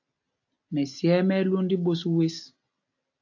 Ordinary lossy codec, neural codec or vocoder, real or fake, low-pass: AAC, 48 kbps; none; real; 7.2 kHz